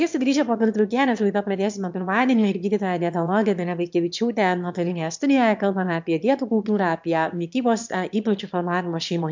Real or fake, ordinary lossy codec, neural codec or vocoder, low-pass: fake; MP3, 64 kbps; autoencoder, 22.05 kHz, a latent of 192 numbers a frame, VITS, trained on one speaker; 7.2 kHz